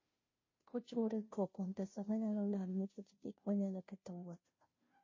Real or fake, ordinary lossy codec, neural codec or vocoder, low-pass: fake; MP3, 32 kbps; codec, 16 kHz, 0.5 kbps, FunCodec, trained on Chinese and English, 25 frames a second; 7.2 kHz